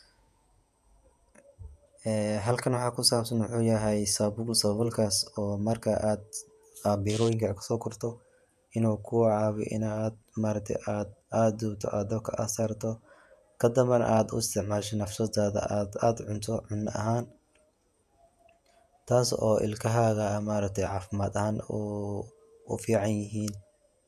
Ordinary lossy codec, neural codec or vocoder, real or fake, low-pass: none; vocoder, 48 kHz, 128 mel bands, Vocos; fake; 14.4 kHz